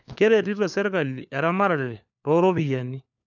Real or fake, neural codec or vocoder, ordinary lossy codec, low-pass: fake; codec, 16 kHz, 4 kbps, FunCodec, trained on LibriTTS, 50 frames a second; none; 7.2 kHz